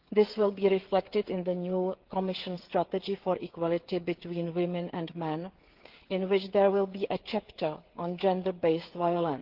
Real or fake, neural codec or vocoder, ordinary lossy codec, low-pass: fake; codec, 16 kHz, 16 kbps, FreqCodec, smaller model; Opus, 16 kbps; 5.4 kHz